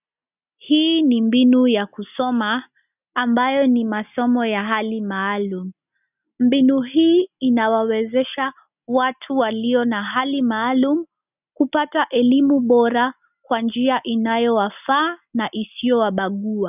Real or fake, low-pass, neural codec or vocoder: real; 3.6 kHz; none